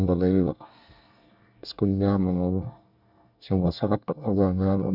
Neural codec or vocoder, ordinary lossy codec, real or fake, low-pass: codec, 24 kHz, 1 kbps, SNAC; none; fake; 5.4 kHz